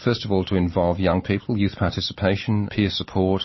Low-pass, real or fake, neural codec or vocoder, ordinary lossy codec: 7.2 kHz; real; none; MP3, 24 kbps